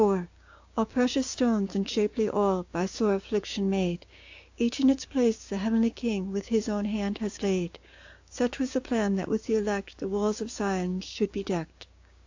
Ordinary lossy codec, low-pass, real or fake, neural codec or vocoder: AAC, 48 kbps; 7.2 kHz; fake; codec, 16 kHz, 6 kbps, DAC